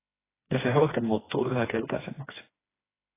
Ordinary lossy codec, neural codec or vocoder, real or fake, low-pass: AAC, 16 kbps; codec, 16 kHz, 2 kbps, FreqCodec, smaller model; fake; 3.6 kHz